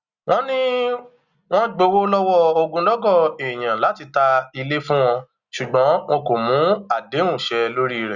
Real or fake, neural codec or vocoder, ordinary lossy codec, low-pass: real; none; Opus, 64 kbps; 7.2 kHz